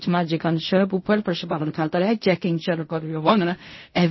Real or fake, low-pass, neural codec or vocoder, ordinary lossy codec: fake; 7.2 kHz; codec, 16 kHz in and 24 kHz out, 0.4 kbps, LongCat-Audio-Codec, fine tuned four codebook decoder; MP3, 24 kbps